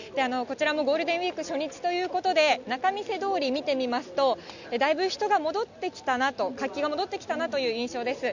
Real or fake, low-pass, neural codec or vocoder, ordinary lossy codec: real; 7.2 kHz; none; none